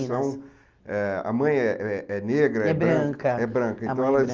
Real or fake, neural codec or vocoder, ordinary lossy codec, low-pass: real; none; Opus, 32 kbps; 7.2 kHz